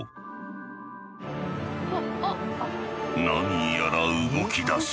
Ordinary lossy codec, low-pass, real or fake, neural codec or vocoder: none; none; real; none